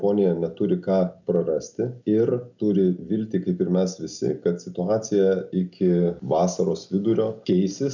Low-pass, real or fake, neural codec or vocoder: 7.2 kHz; real; none